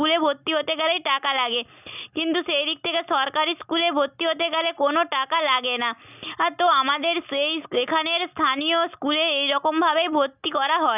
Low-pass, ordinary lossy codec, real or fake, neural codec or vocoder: 3.6 kHz; none; real; none